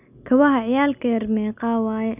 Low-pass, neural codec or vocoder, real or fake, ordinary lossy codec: 3.6 kHz; none; real; none